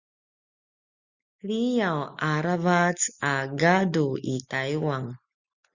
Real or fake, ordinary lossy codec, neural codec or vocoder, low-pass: real; Opus, 64 kbps; none; 7.2 kHz